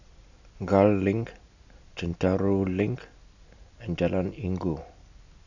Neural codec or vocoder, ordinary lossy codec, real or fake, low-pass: none; none; real; 7.2 kHz